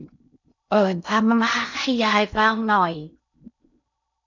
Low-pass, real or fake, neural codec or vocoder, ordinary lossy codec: 7.2 kHz; fake; codec, 16 kHz in and 24 kHz out, 0.6 kbps, FocalCodec, streaming, 2048 codes; none